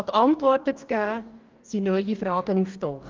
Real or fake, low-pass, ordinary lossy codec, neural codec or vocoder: fake; 7.2 kHz; Opus, 32 kbps; codec, 44.1 kHz, 2.6 kbps, DAC